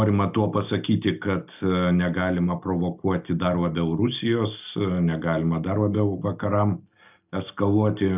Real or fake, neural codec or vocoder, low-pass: real; none; 3.6 kHz